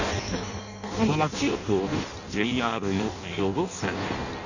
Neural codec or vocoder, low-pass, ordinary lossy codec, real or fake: codec, 16 kHz in and 24 kHz out, 0.6 kbps, FireRedTTS-2 codec; 7.2 kHz; none; fake